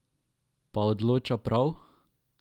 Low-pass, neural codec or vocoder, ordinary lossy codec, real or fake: 19.8 kHz; none; Opus, 32 kbps; real